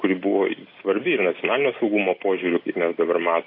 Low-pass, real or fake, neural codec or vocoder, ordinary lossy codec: 5.4 kHz; real; none; AAC, 32 kbps